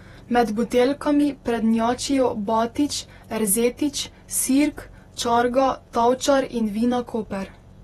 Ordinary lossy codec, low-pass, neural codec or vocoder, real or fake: AAC, 32 kbps; 19.8 kHz; vocoder, 48 kHz, 128 mel bands, Vocos; fake